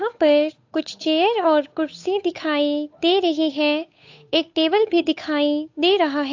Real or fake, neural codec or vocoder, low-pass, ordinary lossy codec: fake; codec, 16 kHz, 8 kbps, FunCodec, trained on Chinese and English, 25 frames a second; 7.2 kHz; AAC, 48 kbps